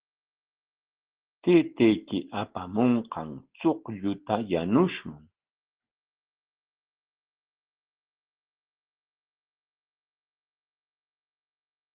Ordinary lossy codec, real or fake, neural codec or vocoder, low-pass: Opus, 16 kbps; real; none; 3.6 kHz